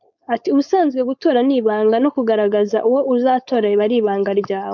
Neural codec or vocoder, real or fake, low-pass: codec, 16 kHz, 4.8 kbps, FACodec; fake; 7.2 kHz